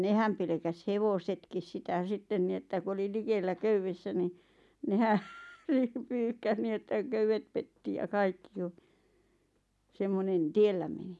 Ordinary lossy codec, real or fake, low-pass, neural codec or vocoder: none; real; none; none